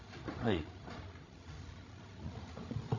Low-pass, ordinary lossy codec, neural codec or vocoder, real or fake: 7.2 kHz; none; codec, 16 kHz, 16 kbps, FreqCodec, larger model; fake